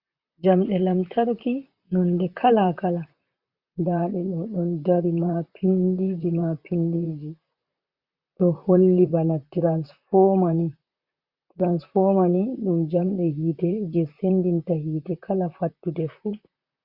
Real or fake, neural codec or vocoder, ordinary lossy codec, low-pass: fake; vocoder, 44.1 kHz, 128 mel bands, Pupu-Vocoder; Opus, 64 kbps; 5.4 kHz